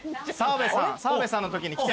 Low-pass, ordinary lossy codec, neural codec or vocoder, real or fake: none; none; none; real